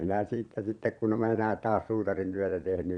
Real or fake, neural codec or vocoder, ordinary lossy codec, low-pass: fake; vocoder, 22.05 kHz, 80 mel bands, Vocos; none; 9.9 kHz